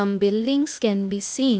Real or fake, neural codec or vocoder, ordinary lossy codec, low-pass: fake; codec, 16 kHz, 0.8 kbps, ZipCodec; none; none